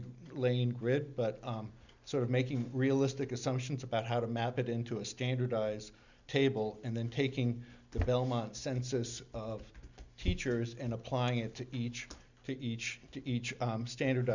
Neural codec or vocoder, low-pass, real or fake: none; 7.2 kHz; real